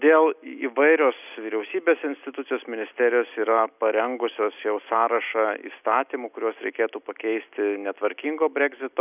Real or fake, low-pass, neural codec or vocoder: real; 3.6 kHz; none